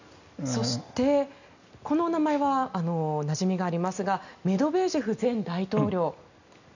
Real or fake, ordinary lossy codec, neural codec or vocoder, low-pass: real; none; none; 7.2 kHz